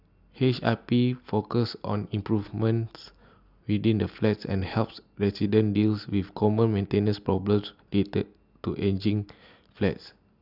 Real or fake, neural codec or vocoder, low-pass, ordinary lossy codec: real; none; 5.4 kHz; none